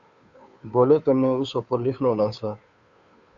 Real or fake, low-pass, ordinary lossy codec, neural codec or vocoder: fake; 7.2 kHz; Opus, 64 kbps; codec, 16 kHz, 2 kbps, FunCodec, trained on Chinese and English, 25 frames a second